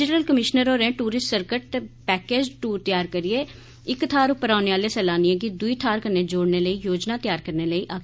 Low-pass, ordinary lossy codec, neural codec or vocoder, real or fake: none; none; none; real